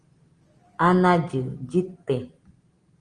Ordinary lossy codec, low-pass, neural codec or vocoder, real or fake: Opus, 24 kbps; 9.9 kHz; none; real